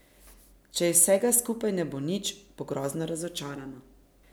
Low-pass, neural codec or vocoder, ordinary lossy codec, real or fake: none; none; none; real